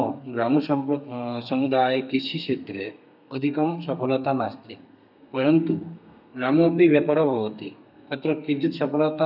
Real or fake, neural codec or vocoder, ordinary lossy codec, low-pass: fake; codec, 32 kHz, 1.9 kbps, SNAC; none; 5.4 kHz